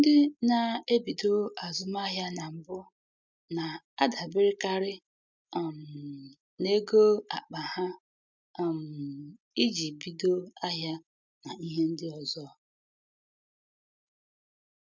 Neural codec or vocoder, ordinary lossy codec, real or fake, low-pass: none; none; real; none